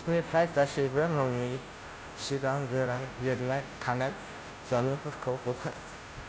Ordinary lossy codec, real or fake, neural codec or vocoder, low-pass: none; fake; codec, 16 kHz, 0.5 kbps, FunCodec, trained on Chinese and English, 25 frames a second; none